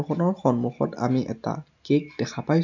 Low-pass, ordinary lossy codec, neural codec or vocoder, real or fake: 7.2 kHz; none; none; real